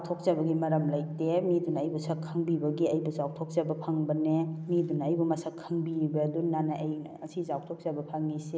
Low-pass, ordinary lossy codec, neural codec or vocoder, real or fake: none; none; none; real